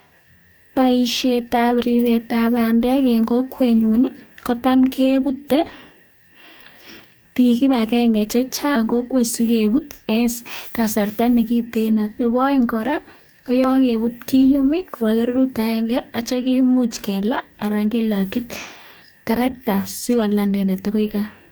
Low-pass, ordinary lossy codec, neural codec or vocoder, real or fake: none; none; codec, 44.1 kHz, 2.6 kbps, DAC; fake